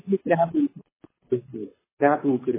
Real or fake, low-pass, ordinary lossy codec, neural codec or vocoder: fake; 3.6 kHz; MP3, 16 kbps; codec, 24 kHz, 6 kbps, HILCodec